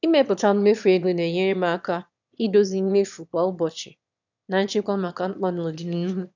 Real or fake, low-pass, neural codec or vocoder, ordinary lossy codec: fake; 7.2 kHz; autoencoder, 22.05 kHz, a latent of 192 numbers a frame, VITS, trained on one speaker; none